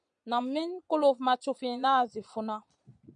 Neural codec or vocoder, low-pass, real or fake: vocoder, 22.05 kHz, 80 mel bands, Vocos; 9.9 kHz; fake